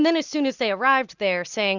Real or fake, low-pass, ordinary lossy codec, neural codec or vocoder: real; 7.2 kHz; Opus, 64 kbps; none